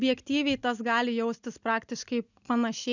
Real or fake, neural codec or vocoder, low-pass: real; none; 7.2 kHz